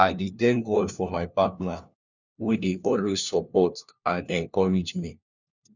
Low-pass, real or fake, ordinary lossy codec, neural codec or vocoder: 7.2 kHz; fake; none; codec, 16 kHz, 1 kbps, FunCodec, trained on LibriTTS, 50 frames a second